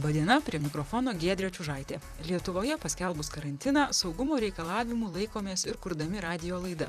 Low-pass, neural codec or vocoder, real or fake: 14.4 kHz; vocoder, 44.1 kHz, 128 mel bands, Pupu-Vocoder; fake